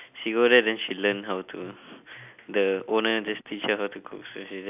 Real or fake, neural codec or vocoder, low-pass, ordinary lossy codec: real; none; 3.6 kHz; none